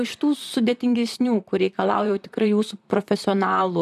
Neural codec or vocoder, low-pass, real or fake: vocoder, 44.1 kHz, 128 mel bands, Pupu-Vocoder; 14.4 kHz; fake